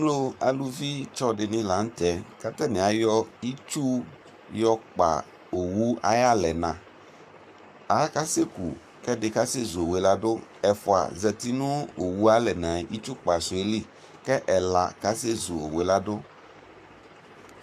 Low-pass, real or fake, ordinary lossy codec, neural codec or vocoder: 14.4 kHz; fake; AAC, 96 kbps; codec, 44.1 kHz, 7.8 kbps, Pupu-Codec